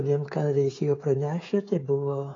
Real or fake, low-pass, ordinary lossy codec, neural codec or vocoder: fake; 7.2 kHz; AAC, 32 kbps; codec, 16 kHz, 16 kbps, FreqCodec, smaller model